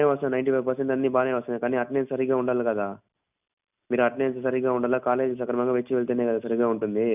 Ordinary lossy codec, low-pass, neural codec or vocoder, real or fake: none; 3.6 kHz; none; real